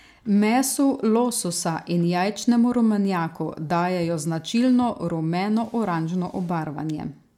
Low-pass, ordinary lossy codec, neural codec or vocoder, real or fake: 19.8 kHz; MP3, 96 kbps; none; real